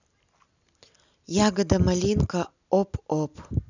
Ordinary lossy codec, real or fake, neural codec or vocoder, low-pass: none; real; none; 7.2 kHz